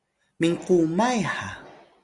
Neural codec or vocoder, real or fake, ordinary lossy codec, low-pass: none; real; Opus, 64 kbps; 10.8 kHz